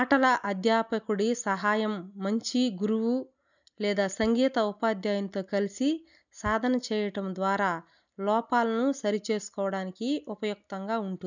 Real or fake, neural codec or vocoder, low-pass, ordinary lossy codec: real; none; 7.2 kHz; none